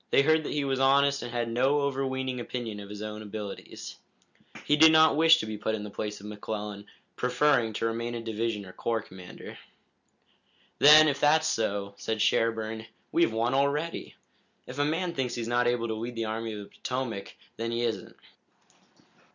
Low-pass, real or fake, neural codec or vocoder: 7.2 kHz; real; none